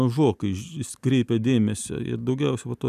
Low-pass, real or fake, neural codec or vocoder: 14.4 kHz; real; none